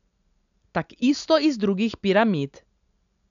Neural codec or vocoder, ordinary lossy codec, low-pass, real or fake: none; none; 7.2 kHz; real